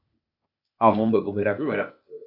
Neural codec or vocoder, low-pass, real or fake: codec, 16 kHz, 0.8 kbps, ZipCodec; 5.4 kHz; fake